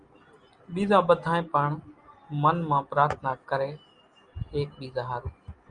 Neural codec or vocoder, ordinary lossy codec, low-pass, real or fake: none; Opus, 32 kbps; 9.9 kHz; real